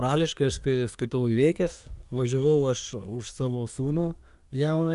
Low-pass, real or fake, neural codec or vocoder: 10.8 kHz; fake; codec, 24 kHz, 1 kbps, SNAC